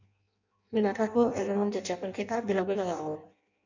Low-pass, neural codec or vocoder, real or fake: 7.2 kHz; codec, 16 kHz in and 24 kHz out, 0.6 kbps, FireRedTTS-2 codec; fake